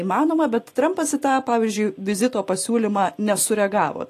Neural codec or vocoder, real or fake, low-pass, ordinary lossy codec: none; real; 14.4 kHz; AAC, 48 kbps